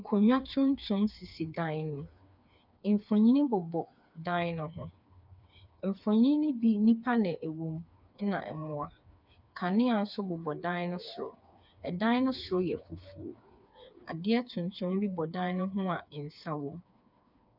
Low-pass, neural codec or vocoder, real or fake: 5.4 kHz; codec, 16 kHz, 4 kbps, FreqCodec, smaller model; fake